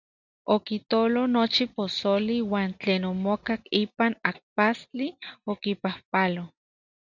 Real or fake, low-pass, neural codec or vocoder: real; 7.2 kHz; none